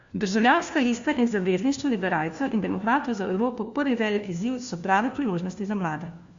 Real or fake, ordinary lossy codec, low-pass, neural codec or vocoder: fake; Opus, 64 kbps; 7.2 kHz; codec, 16 kHz, 1 kbps, FunCodec, trained on LibriTTS, 50 frames a second